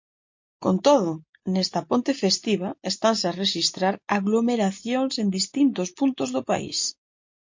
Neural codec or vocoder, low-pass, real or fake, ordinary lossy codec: none; 7.2 kHz; real; MP3, 48 kbps